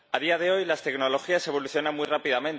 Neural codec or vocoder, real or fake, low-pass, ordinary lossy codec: none; real; none; none